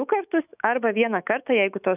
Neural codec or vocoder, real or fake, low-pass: autoencoder, 48 kHz, 128 numbers a frame, DAC-VAE, trained on Japanese speech; fake; 3.6 kHz